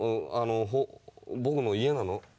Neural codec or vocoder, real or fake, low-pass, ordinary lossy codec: none; real; none; none